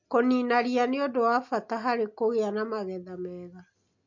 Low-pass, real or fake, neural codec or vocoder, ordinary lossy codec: 7.2 kHz; real; none; MP3, 48 kbps